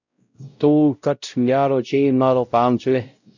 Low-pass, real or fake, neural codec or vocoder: 7.2 kHz; fake; codec, 16 kHz, 0.5 kbps, X-Codec, WavLM features, trained on Multilingual LibriSpeech